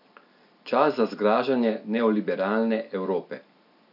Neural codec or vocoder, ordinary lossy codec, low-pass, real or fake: none; none; 5.4 kHz; real